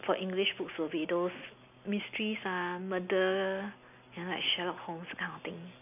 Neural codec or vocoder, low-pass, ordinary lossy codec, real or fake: none; 3.6 kHz; none; real